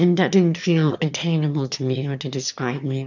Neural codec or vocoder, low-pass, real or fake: autoencoder, 22.05 kHz, a latent of 192 numbers a frame, VITS, trained on one speaker; 7.2 kHz; fake